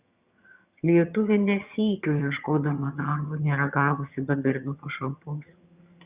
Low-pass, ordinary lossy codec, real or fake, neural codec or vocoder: 3.6 kHz; Opus, 64 kbps; fake; vocoder, 22.05 kHz, 80 mel bands, HiFi-GAN